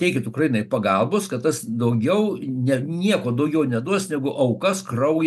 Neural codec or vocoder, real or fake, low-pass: none; real; 14.4 kHz